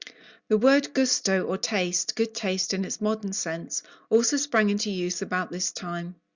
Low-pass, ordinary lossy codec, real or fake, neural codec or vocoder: 7.2 kHz; Opus, 64 kbps; real; none